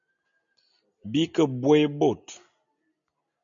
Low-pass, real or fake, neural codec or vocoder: 7.2 kHz; real; none